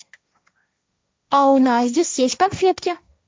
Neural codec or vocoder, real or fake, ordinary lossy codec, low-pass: codec, 16 kHz, 1.1 kbps, Voila-Tokenizer; fake; none; none